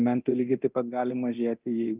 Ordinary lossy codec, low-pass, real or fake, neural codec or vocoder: Opus, 32 kbps; 3.6 kHz; fake; codec, 24 kHz, 0.9 kbps, DualCodec